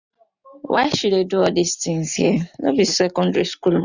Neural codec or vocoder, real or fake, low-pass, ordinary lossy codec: none; real; 7.2 kHz; none